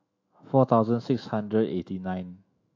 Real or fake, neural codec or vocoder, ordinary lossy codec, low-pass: fake; autoencoder, 48 kHz, 128 numbers a frame, DAC-VAE, trained on Japanese speech; AAC, 48 kbps; 7.2 kHz